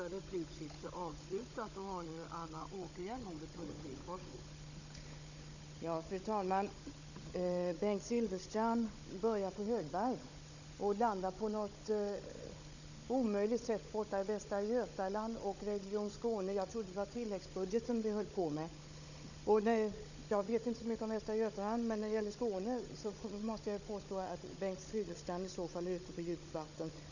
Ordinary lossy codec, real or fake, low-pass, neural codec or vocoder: none; fake; 7.2 kHz; codec, 16 kHz, 16 kbps, FunCodec, trained on Chinese and English, 50 frames a second